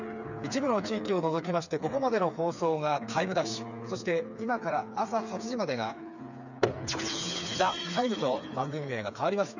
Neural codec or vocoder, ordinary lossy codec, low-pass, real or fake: codec, 16 kHz, 4 kbps, FreqCodec, smaller model; none; 7.2 kHz; fake